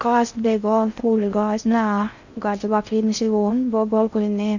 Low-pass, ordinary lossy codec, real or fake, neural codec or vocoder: 7.2 kHz; none; fake; codec, 16 kHz in and 24 kHz out, 0.6 kbps, FocalCodec, streaming, 4096 codes